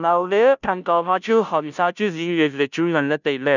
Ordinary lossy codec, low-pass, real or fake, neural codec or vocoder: none; 7.2 kHz; fake; codec, 16 kHz, 0.5 kbps, FunCodec, trained on Chinese and English, 25 frames a second